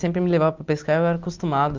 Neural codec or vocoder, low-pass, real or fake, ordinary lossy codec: none; 7.2 kHz; real; Opus, 32 kbps